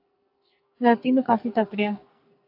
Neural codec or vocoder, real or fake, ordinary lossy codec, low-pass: codec, 44.1 kHz, 2.6 kbps, SNAC; fake; AAC, 32 kbps; 5.4 kHz